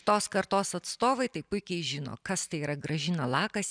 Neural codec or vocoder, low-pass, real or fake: none; 9.9 kHz; real